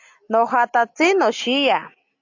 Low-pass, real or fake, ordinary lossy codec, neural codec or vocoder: 7.2 kHz; real; AAC, 48 kbps; none